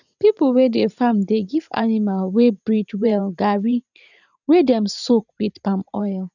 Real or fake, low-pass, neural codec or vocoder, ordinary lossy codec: fake; 7.2 kHz; vocoder, 44.1 kHz, 128 mel bands every 512 samples, BigVGAN v2; none